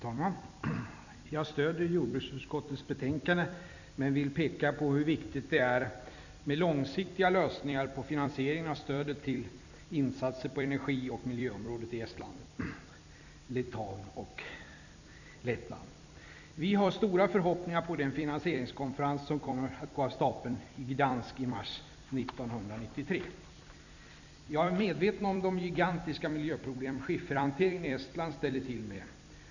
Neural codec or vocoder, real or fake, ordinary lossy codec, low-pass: vocoder, 44.1 kHz, 128 mel bands every 256 samples, BigVGAN v2; fake; none; 7.2 kHz